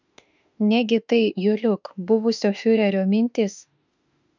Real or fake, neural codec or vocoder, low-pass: fake; autoencoder, 48 kHz, 32 numbers a frame, DAC-VAE, trained on Japanese speech; 7.2 kHz